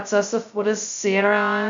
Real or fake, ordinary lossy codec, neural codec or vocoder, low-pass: fake; MP3, 48 kbps; codec, 16 kHz, 0.2 kbps, FocalCodec; 7.2 kHz